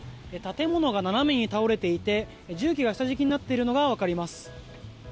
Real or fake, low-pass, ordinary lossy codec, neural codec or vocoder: real; none; none; none